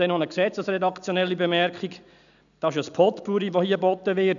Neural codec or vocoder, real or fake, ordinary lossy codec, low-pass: none; real; none; 7.2 kHz